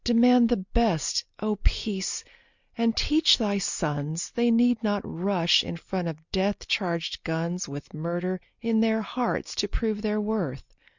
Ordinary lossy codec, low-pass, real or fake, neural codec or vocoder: Opus, 64 kbps; 7.2 kHz; real; none